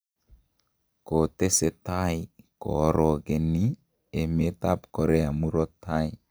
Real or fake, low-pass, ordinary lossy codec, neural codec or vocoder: real; none; none; none